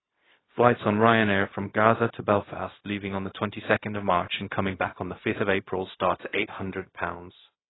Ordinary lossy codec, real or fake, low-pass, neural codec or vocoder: AAC, 16 kbps; fake; 7.2 kHz; codec, 16 kHz, 0.4 kbps, LongCat-Audio-Codec